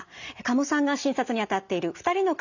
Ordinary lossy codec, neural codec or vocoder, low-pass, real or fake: none; none; 7.2 kHz; real